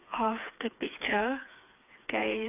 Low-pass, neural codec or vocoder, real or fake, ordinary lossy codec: 3.6 kHz; codec, 16 kHz, 4 kbps, FreqCodec, smaller model; fake; none